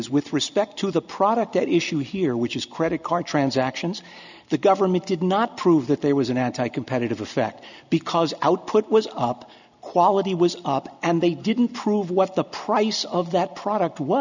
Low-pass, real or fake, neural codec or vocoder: 7.2 kHz; real; none